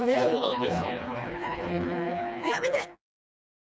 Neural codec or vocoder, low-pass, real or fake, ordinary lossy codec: codec, 16 kHz, 2 kbps, FreqCodec, smaller model; none; fake; none